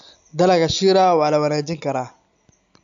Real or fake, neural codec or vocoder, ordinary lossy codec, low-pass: real; none; AAC, 64 kbps; 7.2 kHz